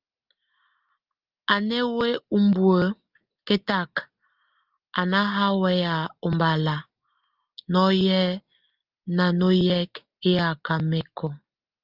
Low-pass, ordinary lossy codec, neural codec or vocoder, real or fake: 5.4 kHz; Opus, 24 kbps; none; real